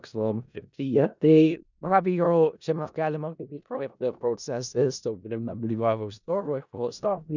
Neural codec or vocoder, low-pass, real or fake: codec, 16 kHz in and 24 kHz out, 0.4 kbps, LongCat-Audio-Codec, four codebook decoder; 7.2 kHz; fake